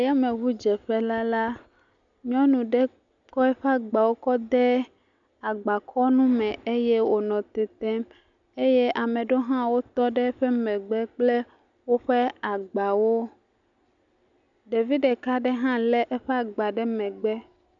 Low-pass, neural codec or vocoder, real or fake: 7.2 kHz; none; real